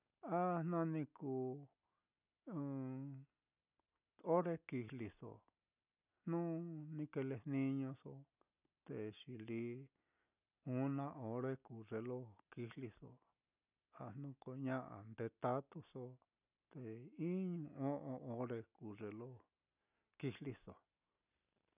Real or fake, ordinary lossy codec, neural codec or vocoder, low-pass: real; none; none; 3.6 kHz